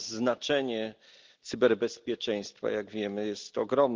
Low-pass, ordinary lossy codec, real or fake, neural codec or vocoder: 7.2 kHz; Opus, 32 kbps; real; none